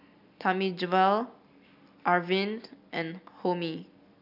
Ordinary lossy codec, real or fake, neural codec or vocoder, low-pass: none; real; none; 5.4 kHz